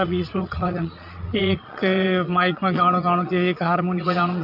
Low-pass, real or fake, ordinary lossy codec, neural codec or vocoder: 5.4 kHz; fake; none; vocoder, 22.05 kHz, 80 mel bands, Vocos